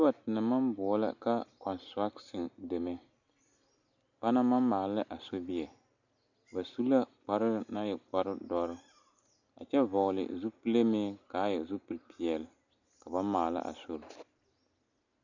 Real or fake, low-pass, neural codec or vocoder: real; 7.2 kHz; none